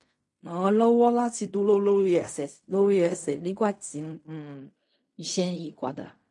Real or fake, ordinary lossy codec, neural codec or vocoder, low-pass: fake; MP3, 48 kbps; codec, 16 kHz in and 24 kHz out, 0.4 kbps, LongCat-Audio-Codec, fine tuned four codebook decoder; 10.8 kHz